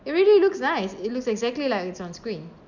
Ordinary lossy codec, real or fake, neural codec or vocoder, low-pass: none; real; none; 7.2 kHz